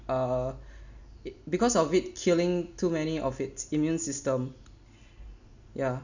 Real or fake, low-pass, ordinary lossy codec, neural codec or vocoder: real; 7.2 kHz; none; none